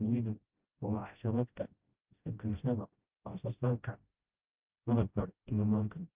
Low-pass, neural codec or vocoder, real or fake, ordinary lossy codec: 3.6 kHz; codec, 16 kHz, 0.5 kbps, FreqCodec, smaller model; fake; Opus, 32 kbps